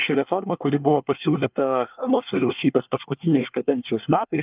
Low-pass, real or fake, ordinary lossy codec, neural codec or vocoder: 3.6 kHz; fake; Opus, 24 kbps; codec, 24 kHz, 1 kbps, SNAC